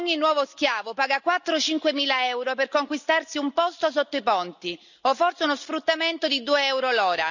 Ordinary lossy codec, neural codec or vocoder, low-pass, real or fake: none; none; 7.2 kHz; real